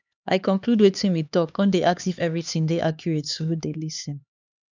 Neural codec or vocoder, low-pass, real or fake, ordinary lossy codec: codec, 16 kHz, 2 kbps, X-Codec, HuBERT features, trained on LibriSpeech; 7.2 kHz; fake; none